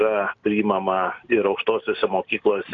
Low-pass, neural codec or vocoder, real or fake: 7.2 kHz; none; real